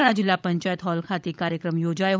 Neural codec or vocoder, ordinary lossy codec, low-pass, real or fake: codec, 16 kHz, 16 kbps, FunCodec, trained on LibriTTS, 50 frames a second; none; none; fake